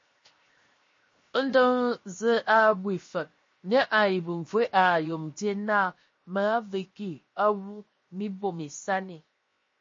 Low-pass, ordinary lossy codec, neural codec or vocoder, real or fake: 7.2 kHz; MP3, 32 kbps; codec, 16 kHz, 0.7 kbps, FocalCodec; fake